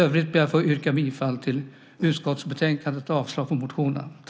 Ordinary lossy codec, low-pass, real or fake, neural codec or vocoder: none; none; real; none